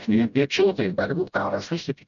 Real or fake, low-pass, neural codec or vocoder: fake; 7.2 kHz; codec, 16 kHz, 0.5 kbps, FreqCodec, smaller model